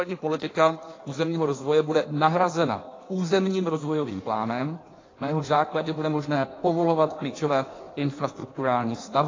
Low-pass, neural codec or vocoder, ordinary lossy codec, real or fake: 7.2 kHz; codec, 16 kHz in and 24 kHz out, 1.1 kbps, FireRedTTS-2 codec; AAC, 32 kbps; fake